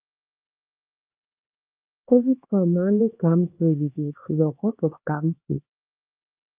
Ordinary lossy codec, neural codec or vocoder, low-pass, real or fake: Opus, 24 kbps; codec, 16 kHz, 2 kbps, X-Codec, HuBERT features, trained on LibriSpeech; 3.6 kHz; fake